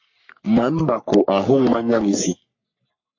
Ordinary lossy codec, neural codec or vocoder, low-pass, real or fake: MP3, 48 kbps; codec, 44.1 kHz, 3.4 kbps, Pupu-Codec; 7.2 kHz; fake